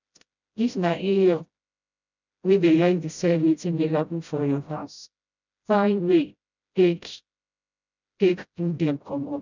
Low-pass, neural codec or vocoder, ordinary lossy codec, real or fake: 7.2 kHz; codec, 16 kHz, 0.5 kbps, FreqCodec, smaller model; none; fake